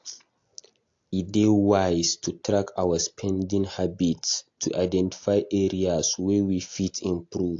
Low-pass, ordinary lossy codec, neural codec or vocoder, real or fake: 7.2 kHz; AAC, 48 kbps; none; real